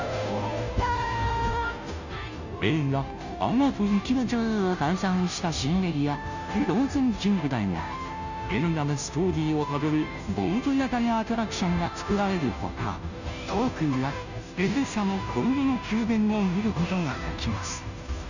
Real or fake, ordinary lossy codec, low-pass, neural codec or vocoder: fake; none; 7.2 kHz; codec, 16 kHz, 0.5 kbps, FunCodec, trained on Chinese and English, 25 frames a second